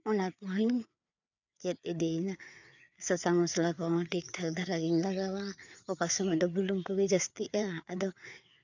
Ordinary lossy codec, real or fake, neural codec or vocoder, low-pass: none; fake; codec, 16 kHz, 4 kbps, FreqCodec, larger model; 7.2 kHz